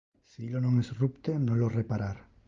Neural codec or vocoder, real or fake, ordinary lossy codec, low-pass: none; real; Opus, 24 kbps; 7.2 kHz